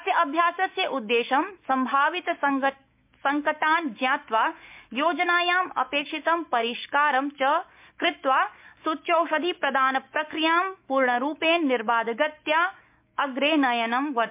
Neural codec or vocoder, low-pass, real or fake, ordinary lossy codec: autoencoder, 48 kHz, 128 numbers a frame, DAC-VAE, trained on Japanese speech; 3.6 kHz; fake; MP3, 32 kbps